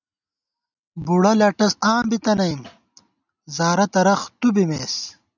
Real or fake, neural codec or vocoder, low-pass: real; none; 7.2 kHz